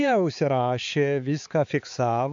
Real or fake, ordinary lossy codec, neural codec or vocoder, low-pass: fake; AAC, 64 kbps; codec, 16 kHz, 4 kbps, X-Codec, HuBERT features, trained on balanced general audio; 7.2 kHz